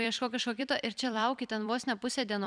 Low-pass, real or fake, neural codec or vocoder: 9.9 kHz; fake; vocoder, 44.1 kHz, 128 mel bands every 256 samples, BigVGAN v2